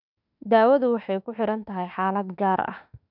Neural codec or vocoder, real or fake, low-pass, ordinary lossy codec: autoencoder, 48 kHz, 32 numbers a frame, DAC-VAE, trained on Japanese speech; fake; 5.4 kHz; none